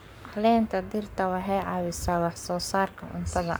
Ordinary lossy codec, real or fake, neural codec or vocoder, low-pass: none; fake; codec, 44.1 kHz, 7.8 kbps, Pupu-Codec; none